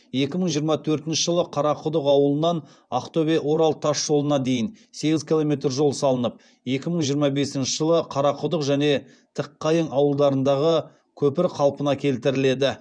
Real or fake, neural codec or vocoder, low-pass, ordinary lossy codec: fake; vocoder, 24 kHz, 100 mel bands, Vocos; 9.9 kHz; none